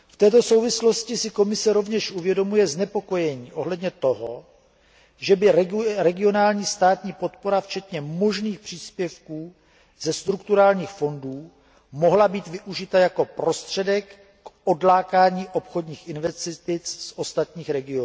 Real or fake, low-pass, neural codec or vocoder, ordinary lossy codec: real; none; none; none